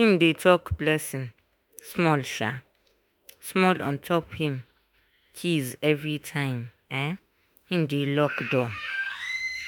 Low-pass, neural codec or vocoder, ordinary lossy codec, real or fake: none; autoencoder, 48 kHz, 32 numbers a frame, DAC-VAE, trained on Japanese speech; none; fake